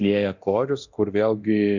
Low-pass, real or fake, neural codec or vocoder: 7.2 kHz; fake; codec, 24 kHz, 0.9 kbps, DualCodec